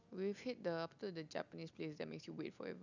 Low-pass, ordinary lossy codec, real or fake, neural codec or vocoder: 7.2 kHz; none; real; none